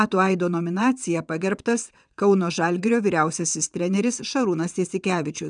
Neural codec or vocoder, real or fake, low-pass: vocoder, 22.05 kHz, 80 mel bands, WaveNeXt; fake; 9.9 kHz